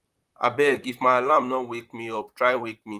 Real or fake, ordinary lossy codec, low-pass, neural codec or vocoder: fake; Opus, 32 kbps; 14.4 kHz; vocoder, 44.1 kHz, 128 mel bands, Pupu-Vocoder